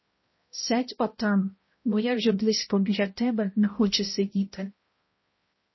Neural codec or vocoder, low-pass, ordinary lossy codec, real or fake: codec, 16 kHz, 0.5 kbps, X-Codec, HuBERT features, trained on balanced general audio; 7.2 kHz; MP3, 24 kbps; fake